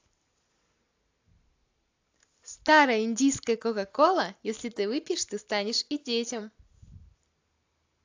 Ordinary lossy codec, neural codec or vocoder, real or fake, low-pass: AAC, 48 kbps; none; real; 7.2 kHz